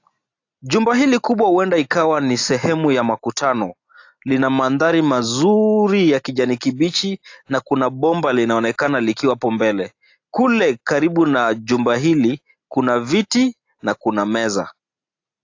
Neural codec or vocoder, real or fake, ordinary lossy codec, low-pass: none; real; AAC, 48 kbps; 7.2 kHz